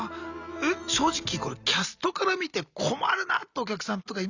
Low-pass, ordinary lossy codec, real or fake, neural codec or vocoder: 7.2 kHz; Opus, 64 kbps; real; none